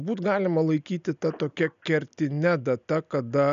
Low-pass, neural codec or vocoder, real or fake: 7.2 kHz; none; real